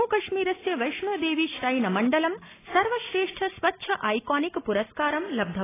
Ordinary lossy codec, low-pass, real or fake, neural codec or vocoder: AAC, 16 kbps; 3.6 kHz; real; none